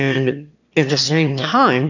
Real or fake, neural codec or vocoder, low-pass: fake; autoencoder, 22.05 kHz, a latent of 192 numbers a frame, VITS, trained on one speaker; 7.2 kHz